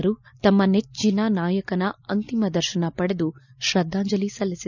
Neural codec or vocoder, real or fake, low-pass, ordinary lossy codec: none; real; 7.2 kHz; none